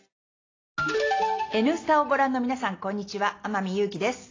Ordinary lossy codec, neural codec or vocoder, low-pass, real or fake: AAC, 32 kbps; none; 7.2 kHz; real